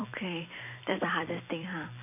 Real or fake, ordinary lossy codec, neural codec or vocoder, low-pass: fake; none; vocoder, 44.1 kHz, 128 mel bands every 256 samples, BigVGAN v2; 3.6 kHz